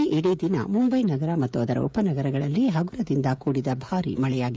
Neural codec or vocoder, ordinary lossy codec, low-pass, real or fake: codec, 16 kHz, 16 kbps, FreqCodec, smaller model; none; none; fake